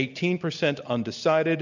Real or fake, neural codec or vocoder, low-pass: real; none; 7.2 kHz